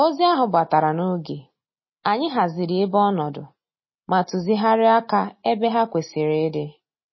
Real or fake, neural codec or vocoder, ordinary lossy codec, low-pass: real; none; MP3, 24 kbps; 7.2 kHz